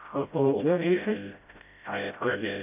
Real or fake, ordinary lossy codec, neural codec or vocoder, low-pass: fake; none; codec, 16 kHz, 0.5 kbps, FreqCodec, smaller model; 3.6 kHz